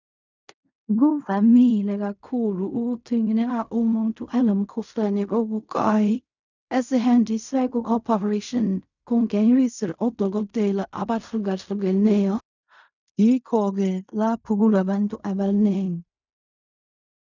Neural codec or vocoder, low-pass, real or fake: codec, 16 kHz in and 24 kHz out, 0.4 kbps, LongCat-Audio-Codec, fine tuned four codebook decoder; 7.2 kHz; fake